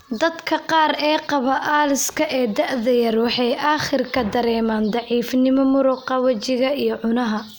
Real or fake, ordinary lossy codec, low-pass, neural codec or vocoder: real; none; none; none